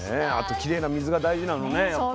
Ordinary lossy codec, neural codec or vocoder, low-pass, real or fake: none; none; none; real